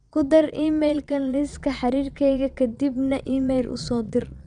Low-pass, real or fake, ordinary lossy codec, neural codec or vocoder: 9.9 kHz; fake; none; vocoder, 22.05 kHz, 80 mel bands, Vocos